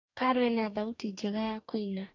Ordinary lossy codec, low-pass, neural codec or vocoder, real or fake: AAC, 48 kbps; 7.2 kHz; codec, 44.1 kHz, 2.6 kbps, DAC; fake